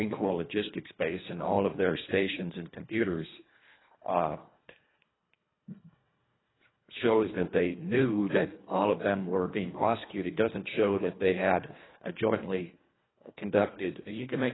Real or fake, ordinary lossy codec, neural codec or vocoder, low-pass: fake; AAC, 16 kbps; codec, 24 kHz, 1.5 kbps, HILCodec; 7.2 kHz